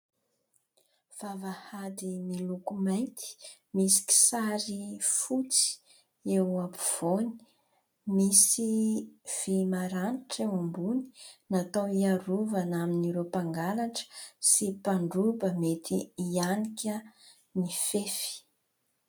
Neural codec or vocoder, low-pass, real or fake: none; 19.8 kHz; real